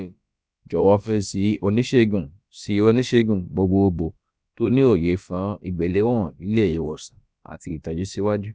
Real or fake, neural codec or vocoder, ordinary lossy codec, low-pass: fake; codec, 16 kHz, about 1 kbps, DyCAST, with the encoder's durations; none; none